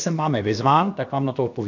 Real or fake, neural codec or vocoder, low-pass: fake; codec, 16 kHz, about 1 kbps, DyCAST, with the encoder's durations; 7.2 kHz